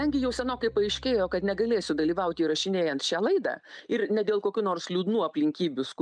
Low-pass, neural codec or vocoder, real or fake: 9.9 kHz; none; real